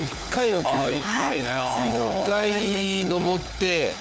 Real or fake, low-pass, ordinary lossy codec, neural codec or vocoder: fake; none; none; codec, 16 kHz, 4 kbps, FunCodec, trained on LibriTTS, 50 frames a second